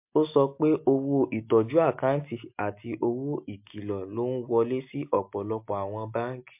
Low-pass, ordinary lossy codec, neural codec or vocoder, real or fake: 3.6 kHz; MP3, 32 kbps; none; real